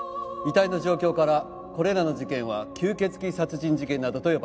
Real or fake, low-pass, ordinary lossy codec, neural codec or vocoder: real; none; none; none